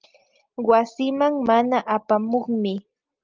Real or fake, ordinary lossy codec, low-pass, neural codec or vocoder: real; Opus, 32 kbps; 7.2 kHz; none